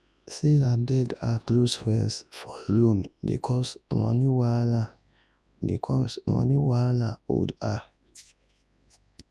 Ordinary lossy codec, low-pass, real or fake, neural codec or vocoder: none; none; fake; codec, 24 kHz, 0.9 kbps, WavTokenizer, large speech release